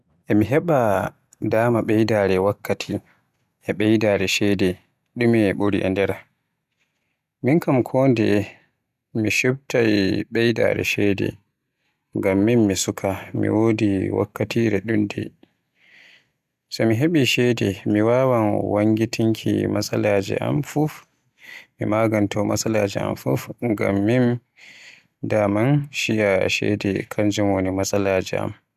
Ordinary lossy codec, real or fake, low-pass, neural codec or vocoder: none; real; 14.4 kHz; none